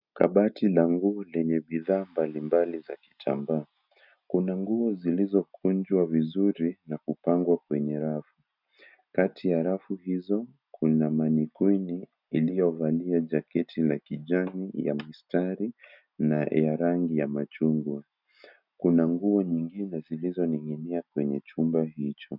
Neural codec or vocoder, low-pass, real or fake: none; 5.4 kHz; real